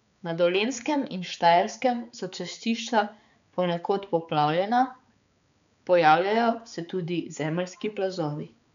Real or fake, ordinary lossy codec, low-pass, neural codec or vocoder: fake; none; 7.2 kHz; codec, 16 kHz, 4 kbps, X-Codec, HuBERT features, trained on balanced general audio